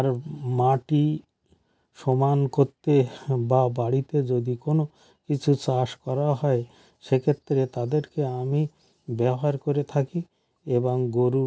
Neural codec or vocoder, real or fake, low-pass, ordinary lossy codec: none; real; none; none